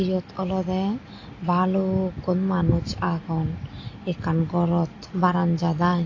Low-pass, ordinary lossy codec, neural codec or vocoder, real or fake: 7.2 kHz; AAC, 32 kbps; none; real